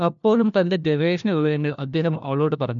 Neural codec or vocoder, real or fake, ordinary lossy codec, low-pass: codec, 16 kHz, 1 kbps, FunCodec, trained on LibriTTS, 50 frames a second; fake; none; 7.2 kHz